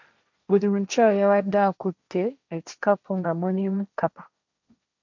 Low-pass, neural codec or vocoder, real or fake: 7.2 kHz; codec, 16 kHz, 1.1 kbps, Voila-Tokenizer; fake